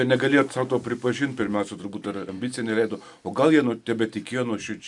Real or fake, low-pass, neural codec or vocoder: real; 10.8 kHz; none